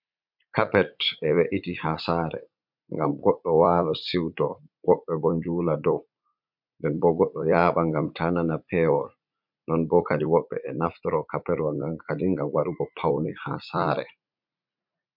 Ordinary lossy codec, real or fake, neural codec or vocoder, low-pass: MP3, 48 kbps; fake; vocoder, 44.1 kHz, 80 mel bands, Vocos; 5.4 kHz